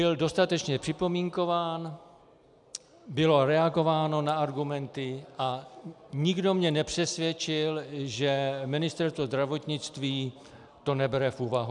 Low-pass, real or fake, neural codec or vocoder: 10.8 kHz; real; none